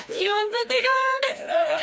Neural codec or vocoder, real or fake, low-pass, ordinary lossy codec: codec, 16 kHz, 1 kbps, FreqCodec, larger model; fake; none; none